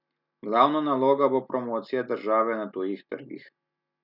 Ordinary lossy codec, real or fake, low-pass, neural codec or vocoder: none; real; 5.4 kHz; none